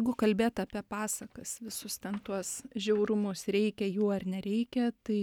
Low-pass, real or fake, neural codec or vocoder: 19.8 kHz; real; none